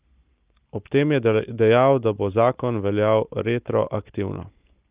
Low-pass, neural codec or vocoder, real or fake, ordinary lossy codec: 3.6 kHz; none; real; Opus, 24 kbps